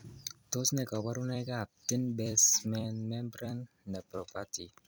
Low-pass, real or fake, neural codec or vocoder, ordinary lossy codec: none; fake; vocoder, 44.1 kHz, 128 mel bands every 256 samples, BigVGAN v2; none